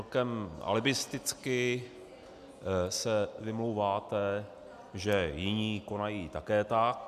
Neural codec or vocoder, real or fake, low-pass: none; real; 14.4 kHz